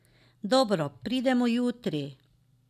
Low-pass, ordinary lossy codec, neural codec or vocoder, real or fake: 14.4 kHz; none; none; real